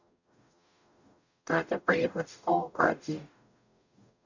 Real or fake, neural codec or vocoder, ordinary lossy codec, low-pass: fake; codec, 44.1 kHz, 0.9 kbps, DAC; none; 7.2 kHz